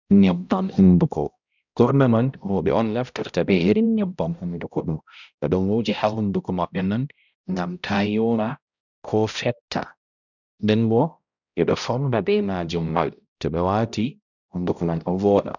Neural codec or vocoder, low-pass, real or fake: codec, 16 kHz, 0.5 kbps, X-Codec, HuBERT features, trained on balanced general audio; 7.2 kHz; fake